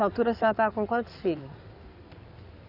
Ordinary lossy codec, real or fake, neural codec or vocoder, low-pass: none; fake; codec, 16 kHz in and 24 kHz out, 2.2 kbps, FireRedTTS-2 codec; 5.4 kHz